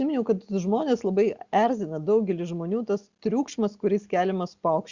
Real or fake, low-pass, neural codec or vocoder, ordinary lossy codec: real; 7.2 kHz; none; Opus, 64 kbps